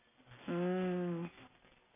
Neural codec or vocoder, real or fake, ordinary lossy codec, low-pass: none; real; AAC, 16 kbps; 3.6 kHz